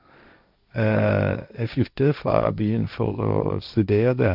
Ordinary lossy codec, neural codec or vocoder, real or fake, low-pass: none; codec, 16 kHz, 1.1 kbps, Voila-Tokenizer; fake; 5.4 kHz